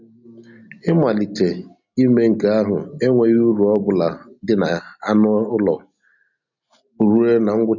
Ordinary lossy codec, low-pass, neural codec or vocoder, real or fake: none; 7.2 kHz; none; real